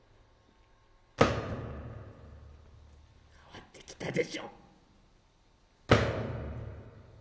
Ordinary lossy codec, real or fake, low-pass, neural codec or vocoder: none; real; none; none